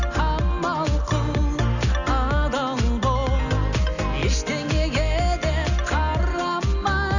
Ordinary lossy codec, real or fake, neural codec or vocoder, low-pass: none; real; none; 7.2 kHz